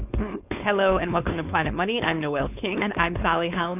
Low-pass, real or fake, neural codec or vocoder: 3.6 kHz; fake; codec, 16 kHz, 4.8 kbps, FACodec